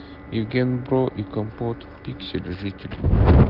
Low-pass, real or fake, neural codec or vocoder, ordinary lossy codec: 5.4 kHz; real; none; Opus, 16 kbps